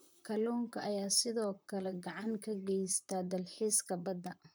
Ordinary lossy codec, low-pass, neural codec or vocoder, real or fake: none; none; vocoder, 44.1 kHz, 128 mel bands, Pupu-Vocoder; fake